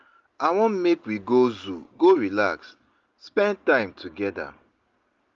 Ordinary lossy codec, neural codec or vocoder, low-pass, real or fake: Opus, 32 kbps; none; 7.2 kHz; real